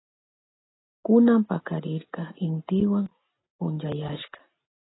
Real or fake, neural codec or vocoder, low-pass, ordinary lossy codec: real; none; 7.2 kHz; AAC, 16 kbps